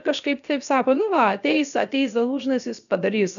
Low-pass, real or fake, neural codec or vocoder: 7.2 kHz; fake; codec, 16 kHz, about 1 kbps, DyCAST, with the encoder's durations